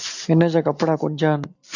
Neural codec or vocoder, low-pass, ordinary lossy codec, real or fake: none; 7.2 kHz; AAC, 48 kbps; real